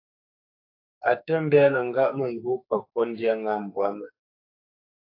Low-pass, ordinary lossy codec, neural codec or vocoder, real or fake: 5.4 kHz; AAC, 32 kbps; codec, 44.1 kHz, 2.6 kbps, SNAC; fake